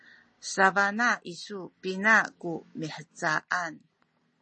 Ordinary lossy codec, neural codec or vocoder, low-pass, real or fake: MP3, 32 kbps; none; 10.8 kHz; real